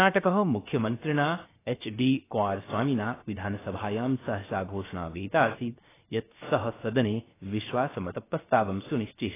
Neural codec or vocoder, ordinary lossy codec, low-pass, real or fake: codec, 16 kHz, 0.7 kbps, FocalCodec; AAC, 16 kbps; 3.6 kHz; fake